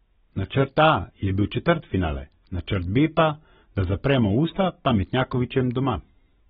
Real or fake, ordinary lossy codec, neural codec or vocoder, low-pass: real; AAC, 16 kbps; none; 19.8 kHz